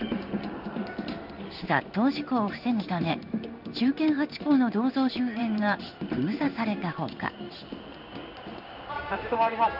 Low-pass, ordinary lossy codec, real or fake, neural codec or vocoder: 5.4 kHz; none; fake; codec, 16 kHz in and 24 kHz out, 1 kbps, XY-Tokenizer